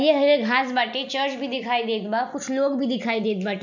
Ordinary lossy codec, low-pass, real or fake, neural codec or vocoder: none; 7.2 kHz; real; none